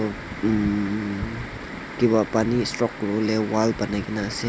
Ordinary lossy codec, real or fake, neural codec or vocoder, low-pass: none; real; none; none